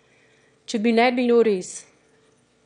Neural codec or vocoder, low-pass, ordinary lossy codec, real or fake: autoencoder, 22.05 kHz, a latent of 192 numbers a frame, VITS, trained on one speaker; 9.9 kHz; none; fake